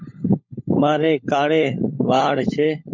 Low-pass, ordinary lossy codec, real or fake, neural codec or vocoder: 7.2 kHz; MP3, 48 kbps; fake; codec, 16 kHz, 16 kbps, FunCodec, trained on LibriTTS, 50 frames a second